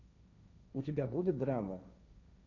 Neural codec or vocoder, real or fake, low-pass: codec, 16 kHz, 1.1 kbps, Voila-Tokenizer; fake; 7.2 kHz